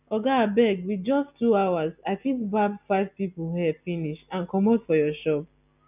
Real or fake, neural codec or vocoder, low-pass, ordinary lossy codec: real; none; 3.6 kHz; none